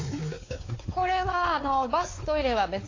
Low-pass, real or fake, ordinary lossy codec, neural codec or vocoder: 7.2 kHz; fake; AAC, 32 kbps; codec, 16 kHz, 4 kbps, X-Codec, WavLM features, trained on Multilingual LibriSpeech